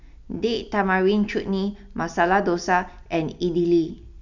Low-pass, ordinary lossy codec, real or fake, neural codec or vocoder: 7.2 kHz; none; real; none